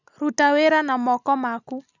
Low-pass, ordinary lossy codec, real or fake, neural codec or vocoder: 7.2 kHz; none; real; none